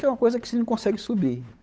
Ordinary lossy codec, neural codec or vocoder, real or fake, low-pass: none; codec, 16 kHz, 8 kbps, FunCodec, trained on Chinese and English, 25 frames a second; fake; none